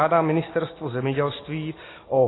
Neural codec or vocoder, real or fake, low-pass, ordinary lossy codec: none; real; 7.2 kHz; AAC, 16 kbps